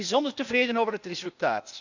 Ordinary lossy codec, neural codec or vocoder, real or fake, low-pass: none; codec, 16 kHz, 0.8 kbps, ZipCodec; fake; 7.2 kHz